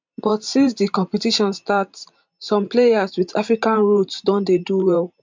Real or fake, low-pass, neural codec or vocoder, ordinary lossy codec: fake; 7.2 kHz; vocoder, 44.1 kHz, 128 mel bands every 512 samples, BigVGAN v2; MP3, 64 kbps